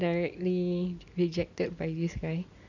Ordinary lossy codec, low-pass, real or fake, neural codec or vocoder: none; 7.2 kHz; real; none